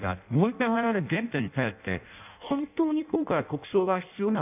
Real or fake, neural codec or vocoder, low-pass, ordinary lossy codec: fake; codec, 16 kHz in and 24 kHz out, 0.6 kbps, FireRedTTS-2 codec; 3.6 kHz; none